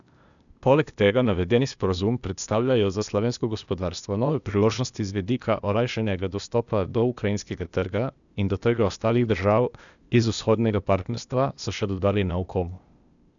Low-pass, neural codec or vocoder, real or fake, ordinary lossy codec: 7.2 kHz; codec, 16 kHz, 0.8 kbps, ZipCodec; fake; none